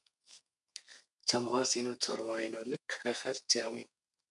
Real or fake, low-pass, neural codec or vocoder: fake; 10.8 kHz; codec, 32 kHz, 1.9 kbps, SNAC